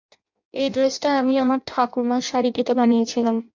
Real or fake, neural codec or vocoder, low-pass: fake; codec, 16 kHz in and 24 kHz out, 0.6 kbps, FireRedTTS-2 codec; 7.2 kHz